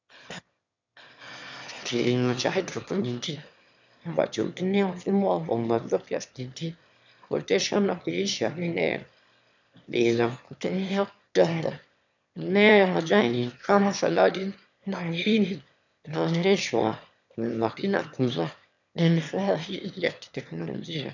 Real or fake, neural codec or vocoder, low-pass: fake; autoencoder, 22.05 kHz, a latent of 192 numbers a frame, VITS, trained on one speaker; 7.2 kHz